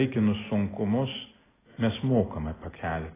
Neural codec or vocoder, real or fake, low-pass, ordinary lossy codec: none; real; 3.6 kHz; AAC, 16 kbps